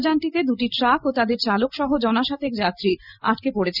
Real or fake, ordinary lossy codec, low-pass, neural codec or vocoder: real; none; 5.4 kHz; none